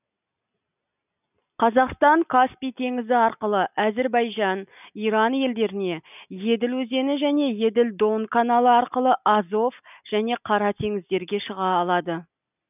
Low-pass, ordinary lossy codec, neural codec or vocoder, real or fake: 3.6 kHz; none; none; real